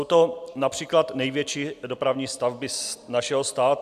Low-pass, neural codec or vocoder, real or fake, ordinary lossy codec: 14.4 kHz; none; real; AAC, 96 kbps